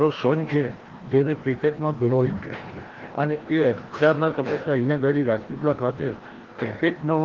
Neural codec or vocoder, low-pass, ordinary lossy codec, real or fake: codec, 16 kHz, 1 kbps, FreqCodec, larger model; 7.2 kHz; Opus, 16 kbps; fake